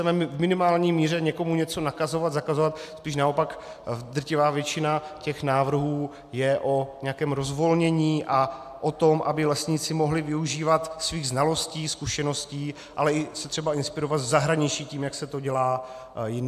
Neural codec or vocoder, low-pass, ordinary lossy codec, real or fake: none; 14.4 kHz; AAC, 96 kbps; real